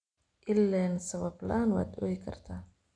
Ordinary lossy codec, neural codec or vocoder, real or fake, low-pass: none; none; real; 9.9 kHz